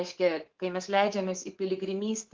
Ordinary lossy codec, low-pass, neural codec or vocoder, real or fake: Opus, 16 kbps; 7.2 kHz; codec, 24 kHz, 3.1 kbps, DualCodec; fake